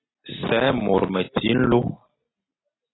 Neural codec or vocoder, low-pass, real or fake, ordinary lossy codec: none; 7.2 kHz; real; AAC, 16 kbps